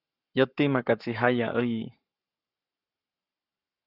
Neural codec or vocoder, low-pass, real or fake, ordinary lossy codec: codec, 44.1 kHz, 7.8 kbps, Pupu-Codec; 5.4 kHz; fake; Opus, 64 kbps